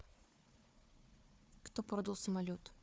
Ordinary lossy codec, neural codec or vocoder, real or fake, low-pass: none; codec, 16 kHz, 4 kbps, FunCodec, trained on Chinese and English, 50 frames a second; fake; none